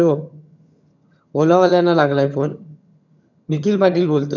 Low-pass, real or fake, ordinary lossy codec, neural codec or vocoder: 7.2 kHz; fake; none; vocoder, 22.05 kHz, 80 mel bands, HiFi-GAN